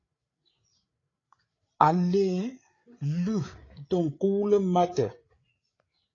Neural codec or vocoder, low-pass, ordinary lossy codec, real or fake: codec, 16 kHz, 8 kbps, FreqCodec, larger model; 7.2 kHz; AAC, 32 kbps; fake